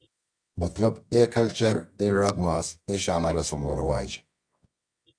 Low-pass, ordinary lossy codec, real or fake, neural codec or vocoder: 9.9 kHz; AAC, 64 kbps; fake; codec, 24 kHz, 0.9 kbps, WavTokenizer, medium music audio release